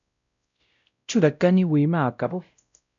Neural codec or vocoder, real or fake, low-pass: codec, 16 kHz, 0.5 kbps, X-Codec, WavLM features, trained on Multilingual LibriSpeech; fake; 7.2 kHz